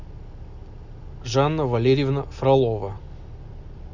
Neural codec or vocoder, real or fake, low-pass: none; real; 7.2 kHz